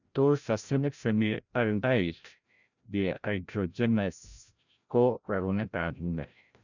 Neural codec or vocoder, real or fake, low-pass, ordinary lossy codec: codec, 16 kHz, 0.5 kbps, FreqCodec, larger model; fake; 7.2 kHz; none